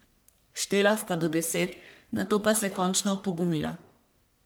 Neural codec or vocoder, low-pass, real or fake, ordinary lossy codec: codec, 44.1 kHz, 1.7 kbps, Pupu-Codec; none; fake; none